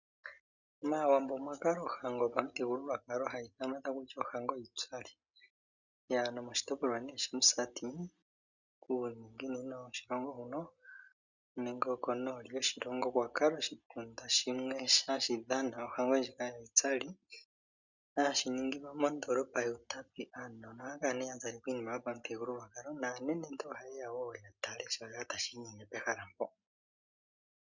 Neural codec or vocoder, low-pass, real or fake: none; 7.2 kHz; real